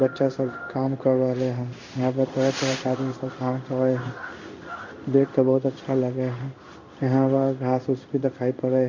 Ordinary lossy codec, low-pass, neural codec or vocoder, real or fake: MP3, 64 kbps; 7.2 kHz; codec, 16 kHz in and 24 kHz out, 1 kbps, XY-Tokenizer; fake